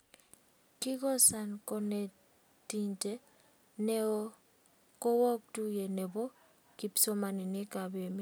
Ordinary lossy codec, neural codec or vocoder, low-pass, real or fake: none; none; none; real